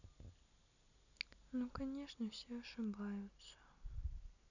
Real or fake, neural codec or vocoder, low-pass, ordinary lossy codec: real; none; 7.2 kHz; none